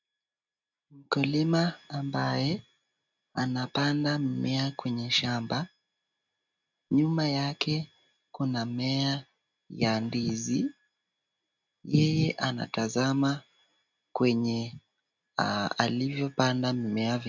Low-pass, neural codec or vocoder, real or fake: 7.2 kHz; none; real